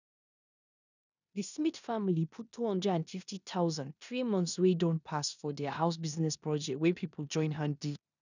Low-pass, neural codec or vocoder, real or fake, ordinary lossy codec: 7.2 kHz; codec, 16 kHz in and 24 kHz out, 0.9 kbps, LongCat-Audio-Codec, four codebook decoder; fake; none